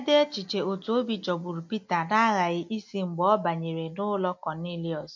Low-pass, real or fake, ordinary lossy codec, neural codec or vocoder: 7.2 kHz; real; MP3, 48 kbps; none